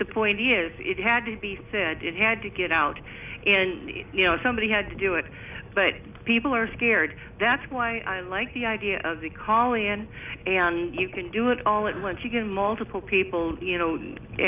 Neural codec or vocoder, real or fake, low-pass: none; real; 3.6 kHz